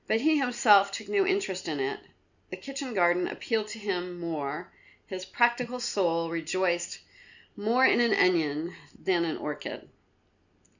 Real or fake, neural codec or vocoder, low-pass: real; none; 7.2 kHz